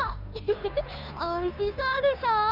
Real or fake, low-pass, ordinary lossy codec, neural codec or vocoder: fake; 5.4 kHz; none; codec, 16 kHz, 2 kbps, FunCodec, trained on Chinese and English, 25 frames a second